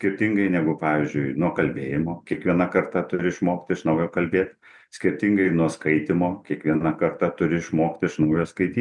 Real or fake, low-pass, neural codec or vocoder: real; 10.8 kHz; none